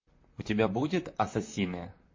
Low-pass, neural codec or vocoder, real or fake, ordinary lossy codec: 7.2 kHz; vocoder, 44.1 kHz, 128 mel bands, Pupu-Vocoder; fake; MP3, 32 kbps